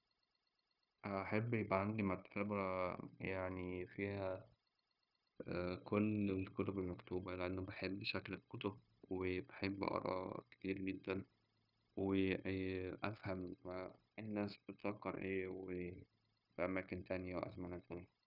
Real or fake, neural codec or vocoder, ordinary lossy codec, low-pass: fake; codec, 16 kHz, 0.9 kbps, LongCat-Audio-Codec; none; 5.4 kHz